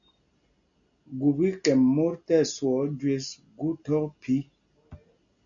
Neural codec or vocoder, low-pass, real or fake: none; 7.2 kHz; real